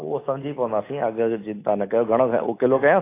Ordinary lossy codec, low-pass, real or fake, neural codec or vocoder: AAC, 24 kbps; 3.6 kHz; real; none